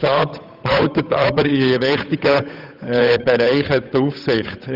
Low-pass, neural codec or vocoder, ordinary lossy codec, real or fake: 5.4 kHz; codec, 16 kHz, 8 kbps, FunCodec, trained on Chinese and English, 25 frames a second; none; fake